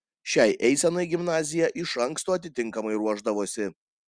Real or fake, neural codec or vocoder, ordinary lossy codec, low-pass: real; none; Opus, 64 kbps; 9.9 kHz